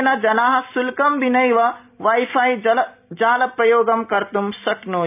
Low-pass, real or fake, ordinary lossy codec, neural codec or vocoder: 3.6 kHz; real; none; none